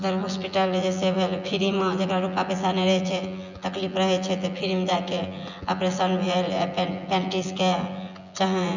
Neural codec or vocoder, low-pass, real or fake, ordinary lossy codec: vocoder, 24 kHz, 100 mel bands, Vocos; 7.2 kHz; fake; none